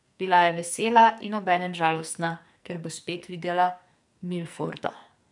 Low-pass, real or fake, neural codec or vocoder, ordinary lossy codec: 10.8 kHz; fake; codec, 44.1 kHz, 2.6 kbps, SNAC; none